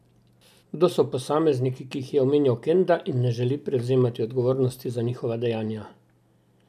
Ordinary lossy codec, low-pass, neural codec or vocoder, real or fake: none; 14.4 kHz; none; real